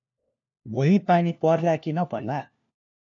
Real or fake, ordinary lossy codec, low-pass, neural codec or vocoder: fake; AAC, 48 kbps; 7.2 kHz; codec, 16 kHz, 1 kbps, FunCodec, trained on LibriTTS, 50 frames a second